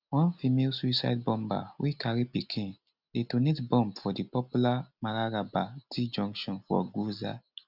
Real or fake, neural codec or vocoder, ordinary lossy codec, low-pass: real; none; none; 5.4 kHz